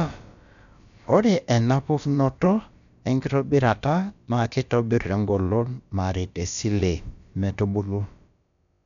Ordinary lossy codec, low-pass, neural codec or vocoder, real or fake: none; 7.2 kHz; codec, 16 kHz, about 1 kbps, DyCAST, with the encoder's durations; fake